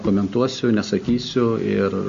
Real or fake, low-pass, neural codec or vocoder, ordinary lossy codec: real; 7.2 kHz; none; MP3, 48 kbps